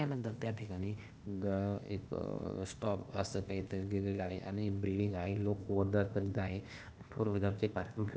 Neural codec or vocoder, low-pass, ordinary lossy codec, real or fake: codec, 16 kHz, 0.8 kbps, ZipCodec; none; none; fake